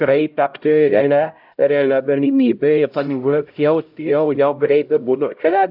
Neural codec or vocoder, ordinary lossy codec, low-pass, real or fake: codec, 16 kHz, 0.5 kbps, X-Codec, HuBERT features, trained on LibriSpeech; AAC, 48 kbps; 5.4 kHz; fake